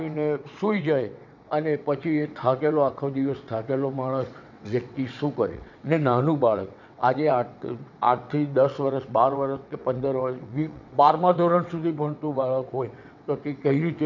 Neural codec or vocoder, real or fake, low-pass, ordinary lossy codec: vocoder, 22.05 kHz, 80 mel bands, WaveNeXt; fake; 7.2 kHz; none